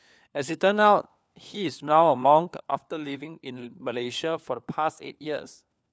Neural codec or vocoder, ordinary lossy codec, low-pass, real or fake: codec, 16 kHz, 4 kbps, FunCodec, trained on LibriTTS, 50 frames a second; none; none; fake